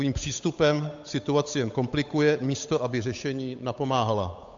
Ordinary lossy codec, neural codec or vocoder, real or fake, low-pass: AAC, 64 kbps; codec, 16 kHz, 8 kbps, FunCodec, trained on Chinese and English, 25 frames a second; fake; 7.2 kHz